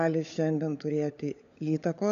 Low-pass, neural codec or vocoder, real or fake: 7.2 kHz; codec, 16 kHz, 16 kbps, FunCodec, trained on LibriTTS, 50 frames a second; fake